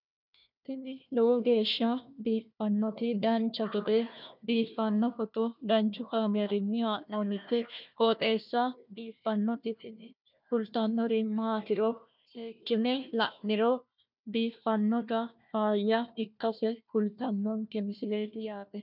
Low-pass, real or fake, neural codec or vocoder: 5.4 kHz; fake; codec, 16 kHz, 1 kbps, FunCodec, trained on Chinese and English, 50 frames a second